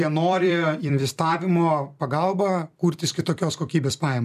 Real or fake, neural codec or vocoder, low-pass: fake; vocoder, 44.1 kHz, 128 mel bands every 256 samples, BigVGAN v2; 14.4 kHz